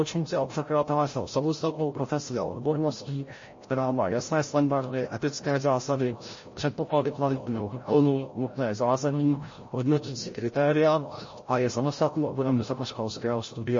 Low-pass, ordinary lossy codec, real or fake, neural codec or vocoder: 7.2 kHz; MP3, 32 kbps; fake; codec, 16 kHz, 0.5 kbps, FreqCodec, larger model